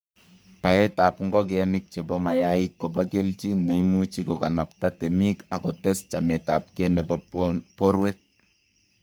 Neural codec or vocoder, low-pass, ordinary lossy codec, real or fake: codec, 44.1 kHz, 3.4 kbps, Pupu-Codec; none; none; fake